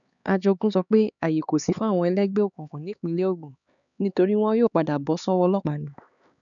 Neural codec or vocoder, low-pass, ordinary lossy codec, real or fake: codec, 16 kHz, 4 kbps, X-Codec, HuBERT features, trained on LibriSpeech; 7.2 kHz; none; fake